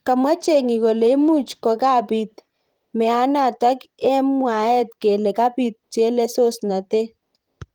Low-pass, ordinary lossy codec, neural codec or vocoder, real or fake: 19.8 kHz; Opus, 24 kbps; vocoder, 44.1 kHz, 128 mel bands, Pupu-Vocoder; fake